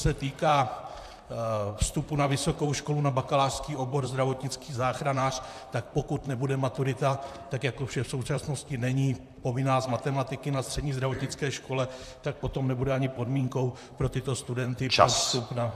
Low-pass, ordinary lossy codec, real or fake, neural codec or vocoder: 14.4 kHz; AAC, 96 kbps; fake; vocoder, 44.1 kHz, 128 mel bands, Pupu-Vocoder